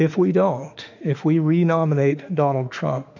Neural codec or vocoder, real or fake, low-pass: autoencoder, 48 kHz, 32 numbers a frame, DAC-VAE, trained on Japanese speech; fake; 7.2 kHz